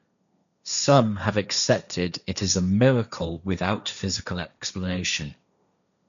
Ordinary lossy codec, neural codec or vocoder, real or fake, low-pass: none; codec, 16 kHz, 1.1 kbps, Voila-Tokenizer; fake; 7.2 kHz